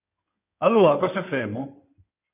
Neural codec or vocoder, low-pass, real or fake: codec, 16 kHz in and 24 kHz out, 2.2 kbps, FireRedTTS-2 codec; 3.6 kHz; fake